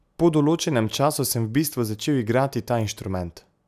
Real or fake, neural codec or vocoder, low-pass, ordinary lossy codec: real; none; 14.4 kHz; none